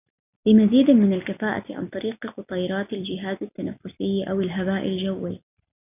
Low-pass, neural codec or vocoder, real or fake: 3.6 kHz; none; real